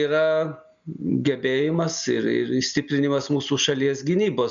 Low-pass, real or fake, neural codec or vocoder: 7.2 kHz; real; none